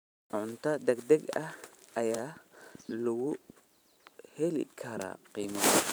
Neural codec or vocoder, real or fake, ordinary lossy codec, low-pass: vocoder, 44.1 kHz, 128 mel bands every 512 samples, BigVGAN v2; fake; none; none